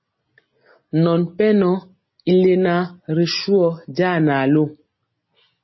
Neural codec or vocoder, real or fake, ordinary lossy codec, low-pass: none; real; MP3, 24 kbps; 7.2 kHz